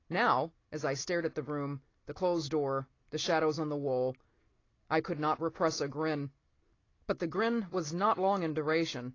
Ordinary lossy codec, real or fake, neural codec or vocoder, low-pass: AAC, 32 kbps; real; none; 7.2 kHz